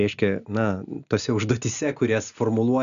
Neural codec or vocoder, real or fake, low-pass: none; real; 7.2 kHz